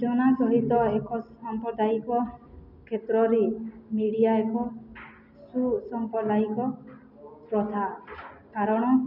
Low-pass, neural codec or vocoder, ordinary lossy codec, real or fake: 5.4 kHz; none; none; real